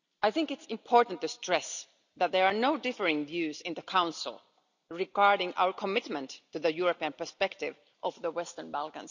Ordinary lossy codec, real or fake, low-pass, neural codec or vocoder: none; real; 7.2 kHz; none